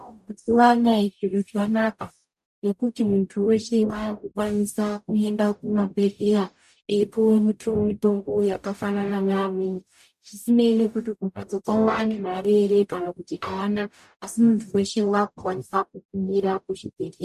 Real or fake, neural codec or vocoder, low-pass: fake; codec, 44.1 kHz, 0.9 kbps, DAC; 14.4 kHz